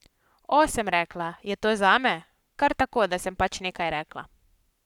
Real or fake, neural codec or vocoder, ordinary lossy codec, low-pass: fake; codec, 44.1 kHz, 7.8 kbps, Pupu-Codec; none; 19.8 kHz